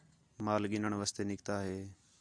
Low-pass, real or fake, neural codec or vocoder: 9.9 kHz; real; none